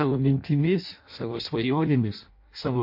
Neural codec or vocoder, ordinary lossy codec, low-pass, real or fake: codec, 16 kHz in and 24 kHz out, 0.6 kbps, FireRedTTS-2 codec; MP3, 32 kbps; 5.4 kHz; fake